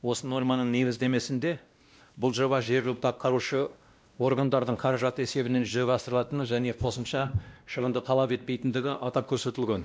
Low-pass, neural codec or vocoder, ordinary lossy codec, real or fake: none; codec, 16 kHz, 1 kbps, X-Codec, WavLM features, trained on Multilingual LibriSpeech; none; fake